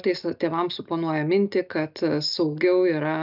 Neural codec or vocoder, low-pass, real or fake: none; 5.4 kHz; real